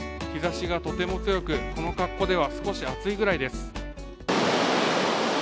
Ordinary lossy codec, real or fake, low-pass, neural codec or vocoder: none; real; none; none